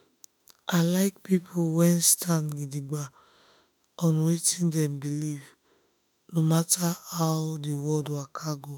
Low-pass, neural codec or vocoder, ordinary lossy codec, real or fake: none; autoencoder, 48 kHz, 32 numbers a frame, DAC-VAE, trained on Japanese speech; none; fake